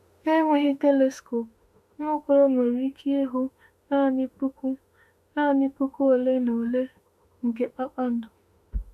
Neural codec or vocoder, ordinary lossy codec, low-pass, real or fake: autoencoder, 48 kHz, 32 numbers a frame, DAC-VAE, trained on Japanese speech; AAC, 96 kbps; 14.4 kHz; fake